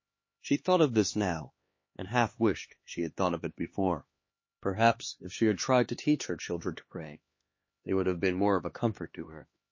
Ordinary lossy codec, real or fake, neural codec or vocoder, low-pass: MP3, 32 kbps; fake; codec, 16 kHz, 2 kbps, X-Codec, HuBERT features, trained on LibriSpeech; 7.2 kHz